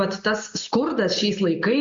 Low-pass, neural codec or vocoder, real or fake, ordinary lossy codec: 7.2 kHz; none; real; MP3, 48 kbps